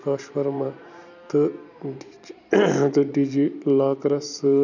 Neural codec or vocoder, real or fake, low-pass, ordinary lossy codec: vocoder, 44.1 kHz, 128 mel bands every 512 samples, BigVGAN v2; fake; 7.2 kHz; none